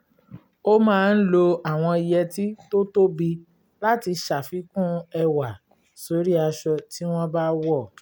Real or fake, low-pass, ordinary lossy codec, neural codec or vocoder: real; none; none; none